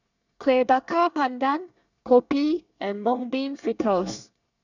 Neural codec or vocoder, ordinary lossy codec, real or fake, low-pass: codec, 24 kHz, 1 kbps, SNAC; none; fake; 7.2 kHz